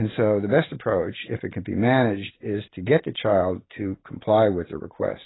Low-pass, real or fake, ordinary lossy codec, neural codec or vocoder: 7.2 kHz; real; AAC, 16 kbps; none